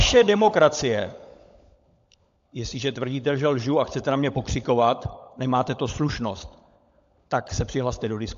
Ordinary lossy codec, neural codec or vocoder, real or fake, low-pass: AAC, 64 kbps; codec, 16 kHz, 16 kbps, FunCodec, trained on LibriTTS, 50 frames a second; fake; 7.2 kHz